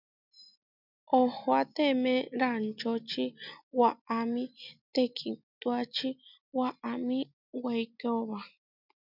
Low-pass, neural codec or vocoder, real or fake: 5.4 kHz; none; real